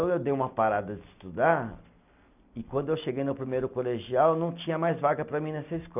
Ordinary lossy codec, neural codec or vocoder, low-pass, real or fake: none; none; 3.6 kHz; real